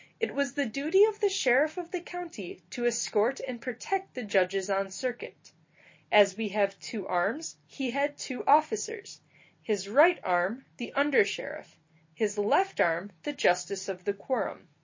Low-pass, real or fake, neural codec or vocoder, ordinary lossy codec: 7.2 kHz; real; none; MP3, 32 kbps